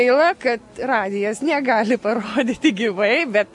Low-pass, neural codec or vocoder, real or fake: 10.8 kHz; none; real